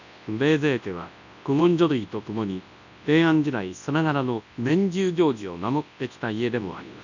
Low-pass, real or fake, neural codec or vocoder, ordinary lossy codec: 7.2 kHz; fake; codec, 24 kHz, 0.9 kbps, WavTokenizer, large speech release; none